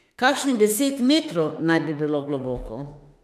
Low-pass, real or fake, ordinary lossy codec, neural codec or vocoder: 14.4 kHz; fake; none; autoencoder, 48 kHz, 32 numbers a frame, DAC-VAE, trained on Japanese speech